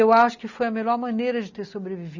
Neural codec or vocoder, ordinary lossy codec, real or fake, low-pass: none; none; real; 7.2 kHz